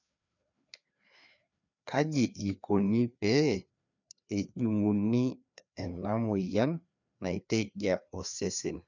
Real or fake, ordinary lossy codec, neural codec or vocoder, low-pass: fake; none; codec, 16 kHz, 2 kbps, FreqCodec, larger model; 7.2 kHz